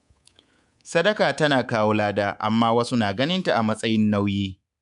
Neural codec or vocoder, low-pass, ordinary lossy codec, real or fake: codec, 24 kHz, 3.1 kbps, DualCodec; 10.8 kHz; none; fake